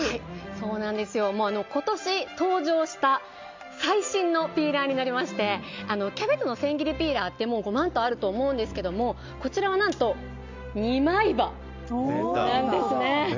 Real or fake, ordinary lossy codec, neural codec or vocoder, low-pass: real; MP3, 64 kbps; none; 7.2 kHz